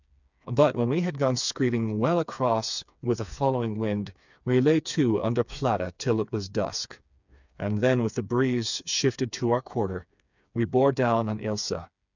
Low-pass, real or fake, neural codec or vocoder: 7.2 kHz; fake; codec, 16 kHz, 4 kbps, FreqCodec, smaller model